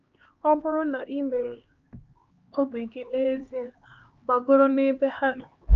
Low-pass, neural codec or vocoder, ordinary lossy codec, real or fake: 7.2 kHz; codec, 16 kHz, 2 kbps, X-Codec, HuBERT features, trained on LibriSpeech; Opus, 24 kbps; fake